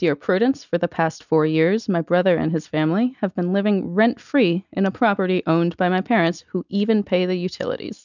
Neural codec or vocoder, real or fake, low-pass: none; real; 7.2 kHz